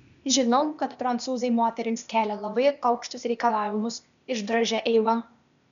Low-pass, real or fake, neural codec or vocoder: 7.2 kHz; fake; codec, 16 kHz, 0.8 kbps, ZipCodec